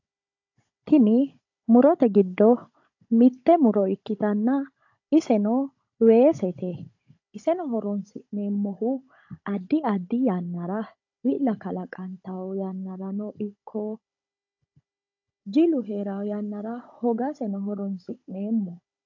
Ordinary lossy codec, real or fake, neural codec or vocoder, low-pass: AAC, 48 kbps; fake; codec, 16 kHz, 16 kbps, FunCodec, trained on Chinese and English, 50 frames a second; 7.2 kHz